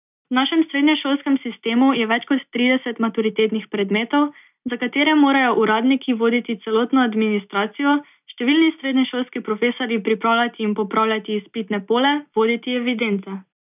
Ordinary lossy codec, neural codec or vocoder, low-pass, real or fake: none; none; 3.6 kHz; real